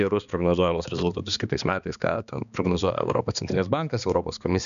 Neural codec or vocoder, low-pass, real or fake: codec, 16 kHz, 2 kbps, X-Codec, HuBERT features, trained on balanced general audio; 7.2 kHz; fake